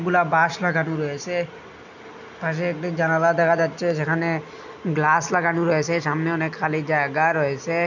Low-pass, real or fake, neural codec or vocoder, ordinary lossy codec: 7.2 kHz; real; none; none